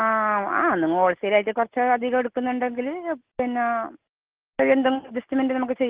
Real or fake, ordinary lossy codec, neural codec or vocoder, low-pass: real; Opus, 16 kbps; none; 3.6 kHz